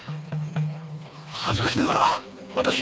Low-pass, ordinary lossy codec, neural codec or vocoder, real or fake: none; none; codec, 16 kHz, 2 kbps, FreqCodec, smaller model; fake